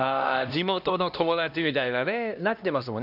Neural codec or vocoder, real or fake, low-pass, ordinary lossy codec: codec, 16 kHz, 1 kbps, X-Codec, HuBERT features, trained on LibriSpeech; fake; 5.4 kHz; none